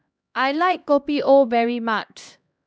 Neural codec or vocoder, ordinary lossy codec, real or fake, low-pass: codec, 16 kHz, 1 kbps, X-Codec, HuBERT features, trained on LibriSpeech; none; fake; none